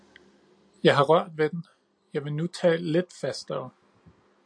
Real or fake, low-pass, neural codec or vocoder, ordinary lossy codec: real; 9.9 kHz; none; AAC, 64 kbps